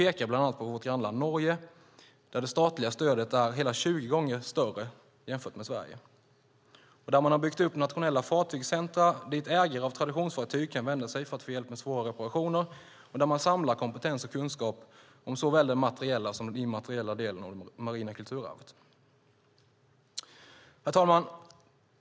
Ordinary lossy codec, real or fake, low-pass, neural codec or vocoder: none; real; none; none